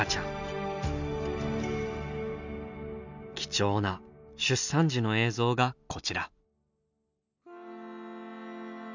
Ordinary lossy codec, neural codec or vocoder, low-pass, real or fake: none; none; 7.2 kHz; real